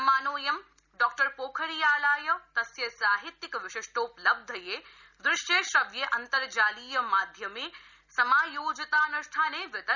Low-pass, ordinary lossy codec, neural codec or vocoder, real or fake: 7.2 kHz; none; none; real